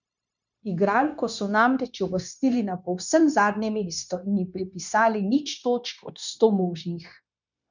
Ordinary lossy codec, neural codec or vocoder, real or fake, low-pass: none; codec, 16 kHz, 0.9 kbps, LongCat-Audio-Codec; fake; 7.2 kHz